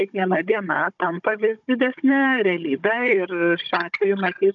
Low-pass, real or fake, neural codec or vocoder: 7.2 kHz; fake; codec, 16 kHz, 16 kbps, FunCodec, trained on Chinese and English, 50 frames a second